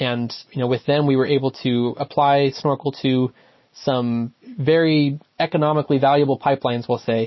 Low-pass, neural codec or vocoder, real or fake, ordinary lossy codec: 7.2 kHz; none; real; MP3, 24 kbps